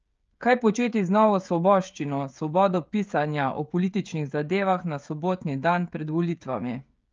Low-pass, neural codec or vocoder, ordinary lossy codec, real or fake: 7.2 kHz; codec, 16 kHz, 16 kbps, FreqCodec, smaller model; Opus, 24 kbps; fake